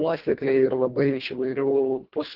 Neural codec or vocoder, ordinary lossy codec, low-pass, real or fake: codec, 24 kHz, 1.5 kbps, HILCodec; Opus, 16 kbps; 5.4 kHz; fake